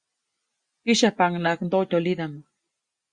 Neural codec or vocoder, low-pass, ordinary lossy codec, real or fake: none; 9.9 kHz; Opus, 64 kbps; real